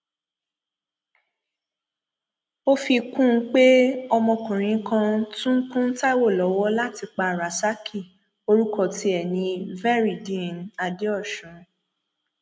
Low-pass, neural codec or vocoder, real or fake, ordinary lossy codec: none; none; real; none